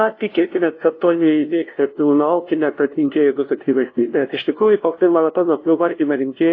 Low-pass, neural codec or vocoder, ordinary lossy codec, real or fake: 7.2 kHz; codec, 16 kHz, 0.5 kbps, FunCodec, trained on LibriTTS, 25 frames a second; AAC, 32 kbps; fake